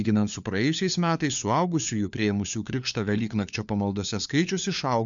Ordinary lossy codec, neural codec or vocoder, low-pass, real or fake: MP3, 96 kbps; codec, 16 kHz, 4 kbps, FunCodec, trained on LibriTTS, 50 frames a second; 7.2 kHz; fake